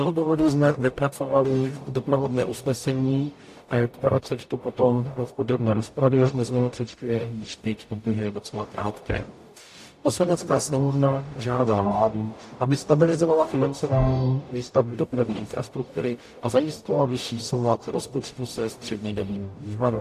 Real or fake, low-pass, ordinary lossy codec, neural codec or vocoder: fake; 14.4 kHz; AAC, 64 kbps; codec, 44.1 kHz, 0.9 kbps, DAC